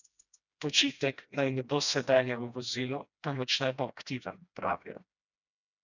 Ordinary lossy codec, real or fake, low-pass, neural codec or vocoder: none; fake; 7.2 kHz; codec, 16 kHz, 1 kbps, FreqCodec, smaller model